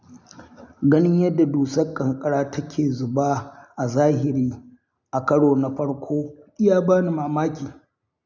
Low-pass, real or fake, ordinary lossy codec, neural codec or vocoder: 7.2 kHz; real; none; none